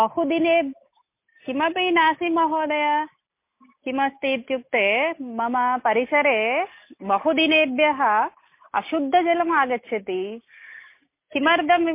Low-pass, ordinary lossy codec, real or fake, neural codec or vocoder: 3.6 kHz; MP3, 24 kbps; real; none